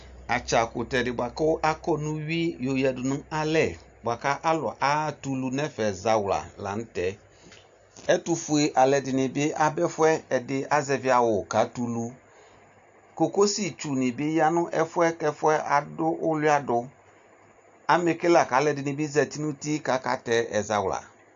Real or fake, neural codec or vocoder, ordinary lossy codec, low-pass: real; none; AAC, 64 kbps; 7.2 kHz